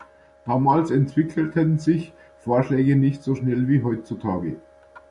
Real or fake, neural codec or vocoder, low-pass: real; none; 10.8 kHz